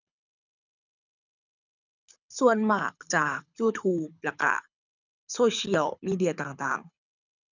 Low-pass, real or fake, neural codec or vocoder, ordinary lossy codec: 7.2 kHz; fake; codec, 24 kHz, 6 kbps, HILCodec; none